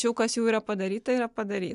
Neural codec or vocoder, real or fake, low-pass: none; real; 10.8 kHz